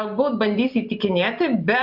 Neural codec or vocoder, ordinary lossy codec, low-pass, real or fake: none; AAC, 48 kbps; 5.4 kHz; real